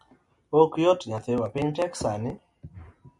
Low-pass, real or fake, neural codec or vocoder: 10.8 kHz; real; none